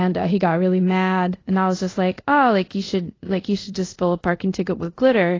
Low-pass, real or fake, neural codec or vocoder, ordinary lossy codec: 7.2 kHz; fake; codec, 24 kHz, 0.5 kbps, DualCodec; AAC, 32 kbps